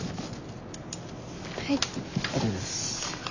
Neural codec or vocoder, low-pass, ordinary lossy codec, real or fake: none; 7.2 kHz; none; real